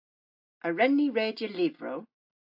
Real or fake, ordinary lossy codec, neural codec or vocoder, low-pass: real; AAC, 48 kbps; none; 5.4 kHz